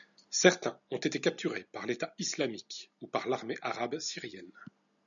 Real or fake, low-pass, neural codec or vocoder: real; 7.2 kHz; none